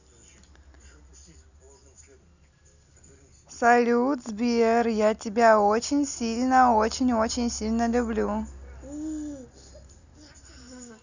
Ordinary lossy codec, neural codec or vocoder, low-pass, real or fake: none; none; 7.2 kHz; real